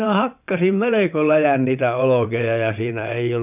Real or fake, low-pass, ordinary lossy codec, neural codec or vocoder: fake; 3.6 kHz; none; vocoder, 22.05 kHz, 80 mel bands, WaveNeXt